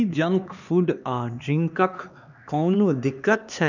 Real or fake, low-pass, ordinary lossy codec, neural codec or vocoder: fake; 7.2 kHz; none; codec, 16 kHz, 2 kbps, X-Codec, HuBERT features, trained on LibriSpeech